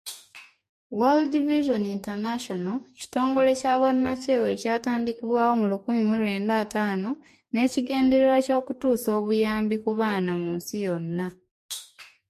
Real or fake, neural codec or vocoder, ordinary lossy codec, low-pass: fake; codec, 44.1 kHz, 2.6 kbps, DAC; MP3, 64 kbps; 14.4 kHz